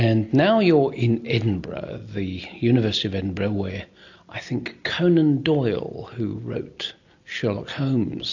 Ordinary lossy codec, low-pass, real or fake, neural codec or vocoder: AAC, 48 kbps; 7.2 kHz; real; none